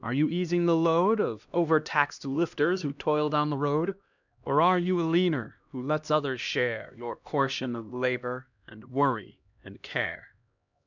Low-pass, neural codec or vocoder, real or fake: 7.2 kHz; codec, 16 kHz, 1 kbps, X-Codec, HuBERT features, trained on LibriSpeech; fake